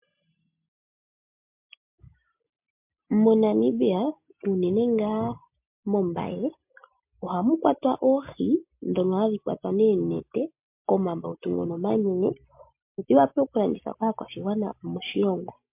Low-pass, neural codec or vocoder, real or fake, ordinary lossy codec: 3.6 kHz; none; real; MP3, 32 kbps